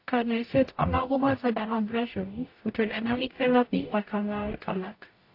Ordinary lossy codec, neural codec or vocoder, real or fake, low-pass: AAC, 32 kbps; codec, 44.1 kHz, 0.9 kbps, DAC; fake; 5.4 kHz